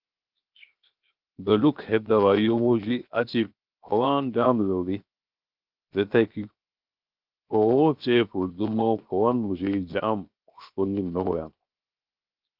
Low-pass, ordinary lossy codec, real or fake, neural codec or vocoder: 5.4 kHz; Opus, 24 kbps; fake; codec, 16 kHz, 0.7 kbps, FocalCodec